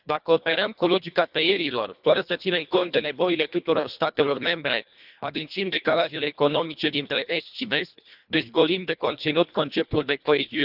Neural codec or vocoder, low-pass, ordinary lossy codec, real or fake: codec, 24 kHz, 1.5 kbps, HILCodec; 5.4 kHz; none; fake